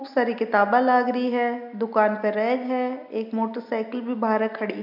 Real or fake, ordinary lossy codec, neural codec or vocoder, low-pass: real; MP3, 48 kbps; none; 5.4 kHz